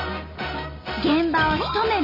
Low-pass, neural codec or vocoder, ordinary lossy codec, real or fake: 5.4 kHz; none; none; real